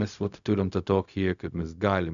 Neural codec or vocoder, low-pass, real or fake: codec, 16 kHz, 0.4 kbps, LongCat-Audio-Codec; 7.2 kHz; fake